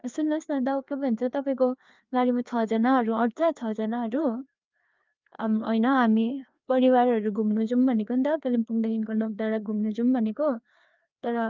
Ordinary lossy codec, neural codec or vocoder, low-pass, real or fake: Opus, 24 kbps; codec, 16 kHz, 2 kbps, FreqCodec, larger model; 7.2 kHz; fake